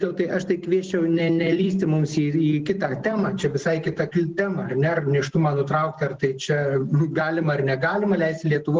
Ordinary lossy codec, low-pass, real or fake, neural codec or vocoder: Opus, 16 kbps; 7.2 kHz; real; none